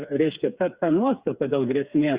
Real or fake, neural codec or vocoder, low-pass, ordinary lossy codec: fake; codec, 16 kHz, 4 kbps, FreqCodec, smaller model; 3.6 kHz; Opus, 64 kbps